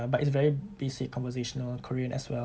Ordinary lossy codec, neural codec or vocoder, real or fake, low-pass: none; none; real; none